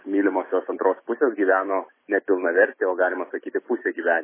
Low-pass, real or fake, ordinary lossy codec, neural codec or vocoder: 3.6 kHz; real; MP3, 16 kbps; none